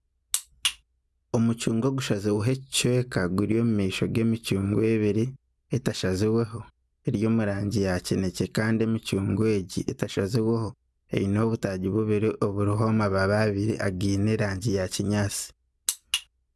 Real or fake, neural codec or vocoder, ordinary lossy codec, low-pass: real; none; none; none